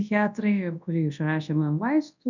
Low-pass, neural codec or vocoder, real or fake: 7.2 kHz; codec, 24 kHz, 0.9 kbps, WavTokenizer, large speech release; fake